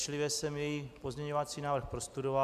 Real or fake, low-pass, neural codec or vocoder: real; 14.4 kHz; none